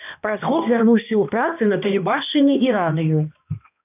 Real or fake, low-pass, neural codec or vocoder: fake; 3.6 kHz; codec, 16 kHz, 1 kbps, X-Codec, HuBERT features, trained on balanced general audio